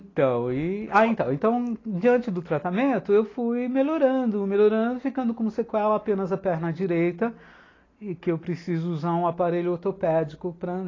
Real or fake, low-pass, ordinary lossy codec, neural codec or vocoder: real; 7.2 kHz; AAC, 32 kbps; none